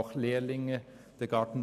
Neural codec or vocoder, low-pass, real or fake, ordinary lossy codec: none; 14.4 kHz; real; none